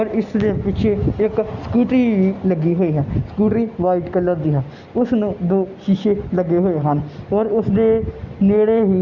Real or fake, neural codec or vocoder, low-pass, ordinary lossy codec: real; none; 7.2 kHz; Opus, 64 kbps